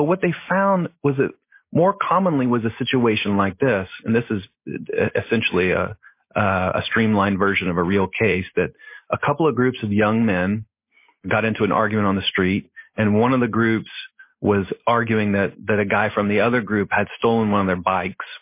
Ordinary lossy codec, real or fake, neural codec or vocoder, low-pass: MP3, 24 kbps; real; none; 3.6 kHz